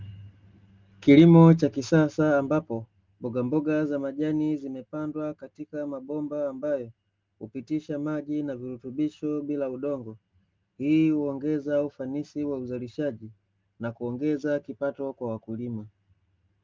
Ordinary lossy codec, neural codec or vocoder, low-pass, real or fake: Opus, 32 kbps; none; 7.2 kHz; real